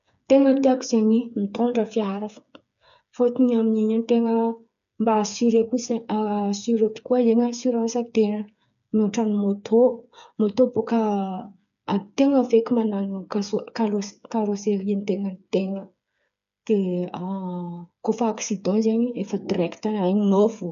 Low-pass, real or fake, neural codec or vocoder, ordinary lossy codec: 7.2 kHz; fake; codec, 16 kHz, 8 kbps, FreqCodec, smaller model; none